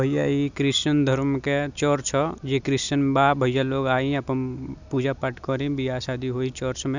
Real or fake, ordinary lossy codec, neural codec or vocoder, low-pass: real; none; none; 7.2 kHz